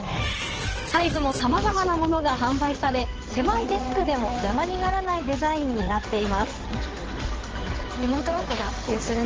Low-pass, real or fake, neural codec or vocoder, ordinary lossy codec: 7.2 kHz; fake; codec, 16 kHz in and 24 kHz out, 2.2 kbps, FireRedTTS-2 codec; Opus, 16 kbps